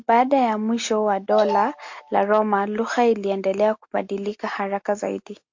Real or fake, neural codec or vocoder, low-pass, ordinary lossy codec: real; none; 7.2 kHz; MP3, 48 kbps